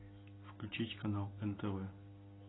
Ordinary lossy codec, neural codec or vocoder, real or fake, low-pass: AAC, 16 kbps; none; real; 7.2 kHz